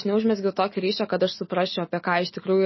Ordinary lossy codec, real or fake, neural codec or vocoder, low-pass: MP3, 24 kbps; real; none; 7.2 kHz